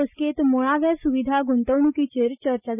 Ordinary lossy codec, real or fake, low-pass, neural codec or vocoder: none; real; 3.6 kHz; none